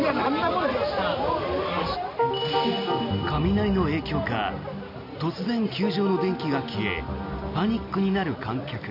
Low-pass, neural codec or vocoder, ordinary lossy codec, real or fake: 5.4 kHz; none; none; real